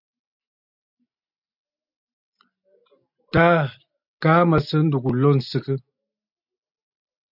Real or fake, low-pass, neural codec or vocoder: real; 5.4 kHz; none